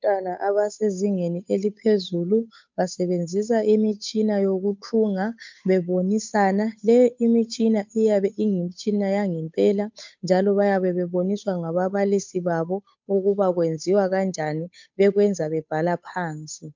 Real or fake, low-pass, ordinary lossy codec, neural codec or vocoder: fake; 7.2 kHz; MP3, 64 kbps; codec, 16 kHz, 8 kbps, FunCodec, trained on Chinese and English, 25 frames a second